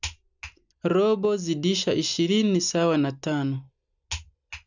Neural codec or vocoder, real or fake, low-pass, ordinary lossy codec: none; real; 7.2 kHz; none